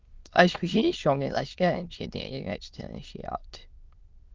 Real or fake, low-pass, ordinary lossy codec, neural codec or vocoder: fake; 7.2 kHz; Opus, 32 kbps; autoencoder, 22.05 kHz, a latent of 192 numbers a frame, VITS, trained on many speakers